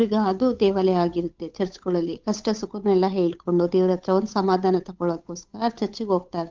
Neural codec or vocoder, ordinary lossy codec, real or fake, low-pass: codec, 16 kHz, 8 kbps, FunCodec, trained on Chinese and English, 25 frames a second; Opus, 24 kbps; fake; 7.2 kHz